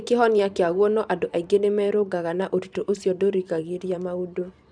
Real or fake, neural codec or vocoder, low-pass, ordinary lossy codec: fake; vocoder, 44.1 kHz, 128 mel bands every 512 samples, BigVGAN v2; 9.9 kHz; none